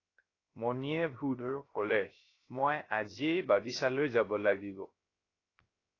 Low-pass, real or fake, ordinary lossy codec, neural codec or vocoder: 7.2 kHz; fake; AAC, 32 kbps; codec, 16 kHz, 0.3 kbps, FocalCodec